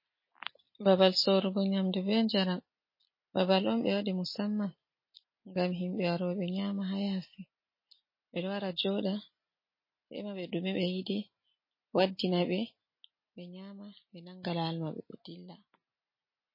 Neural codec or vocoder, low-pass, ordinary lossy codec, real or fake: none; 5.4 kHz; MP3, 24 kbps; real